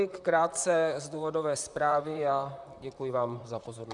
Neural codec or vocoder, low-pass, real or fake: vocoder, 44.1 kHz, 128 mel bands, Pupu-Vocoder; 10.8 kHz; fake